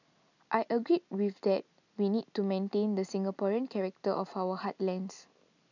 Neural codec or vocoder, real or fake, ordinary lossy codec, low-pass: none; real; none; 7.2 kHz